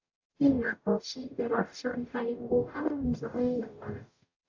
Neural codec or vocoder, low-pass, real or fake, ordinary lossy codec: codec, 44.1 kHz, 0.9 kbps, DAC; 7.2 kHz; fake; AAC, 48 kbps